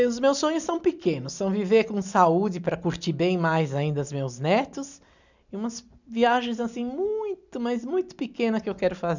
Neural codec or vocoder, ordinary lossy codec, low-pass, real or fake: none; none; 7.2 kHz; real